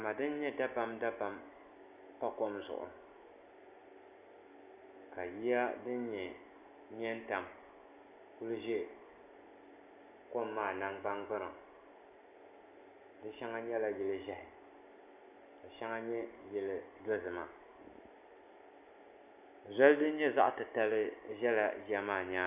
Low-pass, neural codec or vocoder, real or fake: 3.6 kHz; none; real